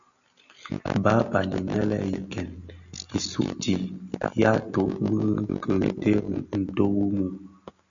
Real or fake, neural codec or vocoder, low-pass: real; none; 7.2 kHz